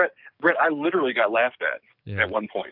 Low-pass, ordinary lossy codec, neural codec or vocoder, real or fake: 5.4 kHz; AAC, 48 kbps; codec, 44.1 kHz, 7.8 kbps, DAC; fake